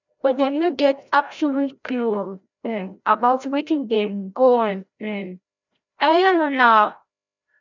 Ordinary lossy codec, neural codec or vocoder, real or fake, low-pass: none; codec, 16 kHz, 0.5 kbps, FreqCodec, larger model; fake; 7.2 kHz